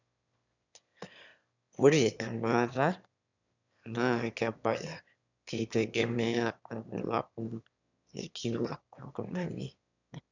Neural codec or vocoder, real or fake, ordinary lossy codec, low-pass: autoencoder, 22.05 kHz, a latent of 192 numbers a frame, VITS, trained on one speaker; fake; none; 7.2 kHz